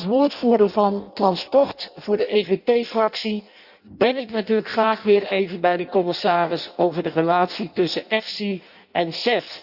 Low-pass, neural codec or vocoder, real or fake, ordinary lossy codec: 5.4 kHz; codec, 16 kHz in and 24 kHz out, 0.6 kbps, FireRedTTS-2 codec; fake; Opus, 64 kbps